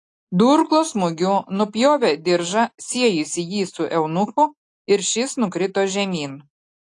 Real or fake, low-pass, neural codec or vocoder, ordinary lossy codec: real; 10.8 kHz; none; AAC, 48 kbps